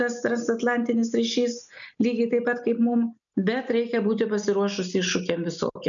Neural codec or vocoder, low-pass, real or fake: none; 7.2 kHz; real